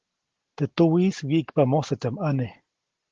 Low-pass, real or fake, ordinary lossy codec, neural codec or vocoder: 7.2 kHz; real; Opus, 16 kbps; none